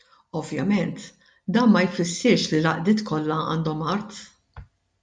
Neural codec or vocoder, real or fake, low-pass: none; real; 9.9 kHz